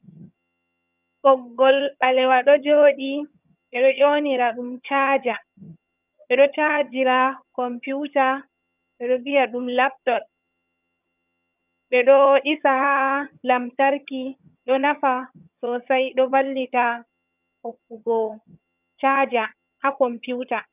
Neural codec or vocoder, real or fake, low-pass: vocoder, 22.05 kHz, 80 mel bands, HiFi-GAN; fake; 3.6 kHz